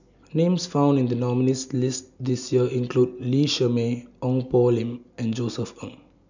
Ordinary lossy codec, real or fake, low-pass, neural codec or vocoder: none; real; 7.2 kHz; none